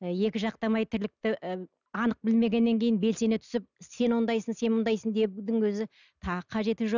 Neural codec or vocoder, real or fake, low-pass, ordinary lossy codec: none; real; 7.2 kHz; none